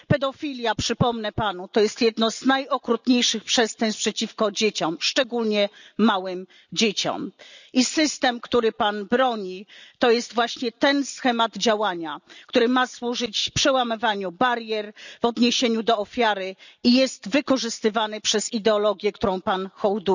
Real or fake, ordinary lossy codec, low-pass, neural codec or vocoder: real; none; 7.2 kHz; none